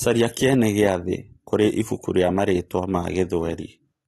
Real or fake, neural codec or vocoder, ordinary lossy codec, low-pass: real; none; AAC, 32 kbps; 19.8 kHz